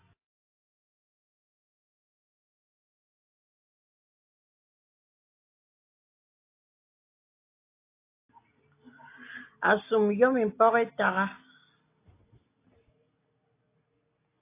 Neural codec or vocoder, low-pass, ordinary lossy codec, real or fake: none; 3.6 kHz; AAC, 24 kbps; real